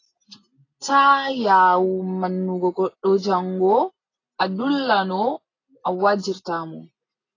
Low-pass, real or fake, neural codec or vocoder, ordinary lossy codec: 7.2 kHz; real; none; AAC, 32 kbps